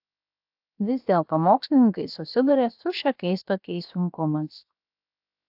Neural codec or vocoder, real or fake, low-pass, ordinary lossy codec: codec, 16 kHz, 0.7 kbps, FocalCodec; fake; 5.4 kHz; AAC, 48 kbps